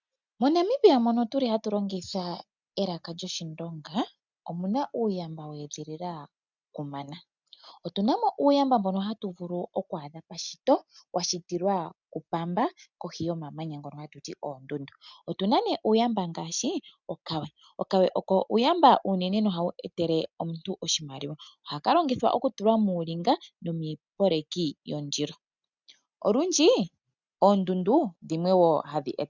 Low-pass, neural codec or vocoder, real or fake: 7.2 kHz; none; real